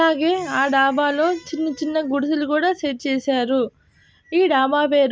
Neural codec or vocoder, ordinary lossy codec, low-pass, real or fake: none; none; none; real